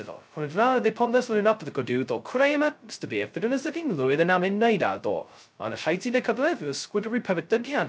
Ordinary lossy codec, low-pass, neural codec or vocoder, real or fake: none; none; codec, 16 kHz, 0.2 kbps, FocalCodec; fake